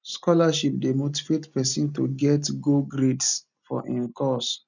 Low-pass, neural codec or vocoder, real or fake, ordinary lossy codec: 7.2 kHz; none; real; none